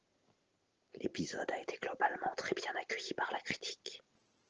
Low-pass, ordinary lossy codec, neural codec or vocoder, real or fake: 7.2 kHz; Opus, 16 kbps; none; real